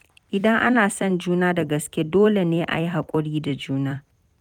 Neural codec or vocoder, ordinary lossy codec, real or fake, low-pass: vocoder, 44.1 kHz, 128 mel bands every 256 samples, BigVGAN v2; none; fake; 19.8 kHz